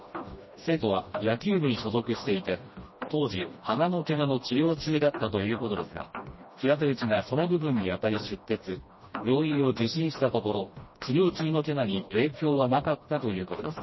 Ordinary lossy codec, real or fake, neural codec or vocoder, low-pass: MP3, 24 kbps; fake; codec, 16 kHz, 1 kbps, FreqCodec, smaller model; 7.2 kHz